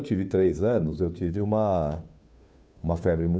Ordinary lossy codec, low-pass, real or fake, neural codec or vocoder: none; none; fake; codec, 16 kHz, 2 kbps, FunCodec, trained on Chinese and English, 25 frames a second